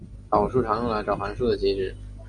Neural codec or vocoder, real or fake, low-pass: none; real; 9.9 kHz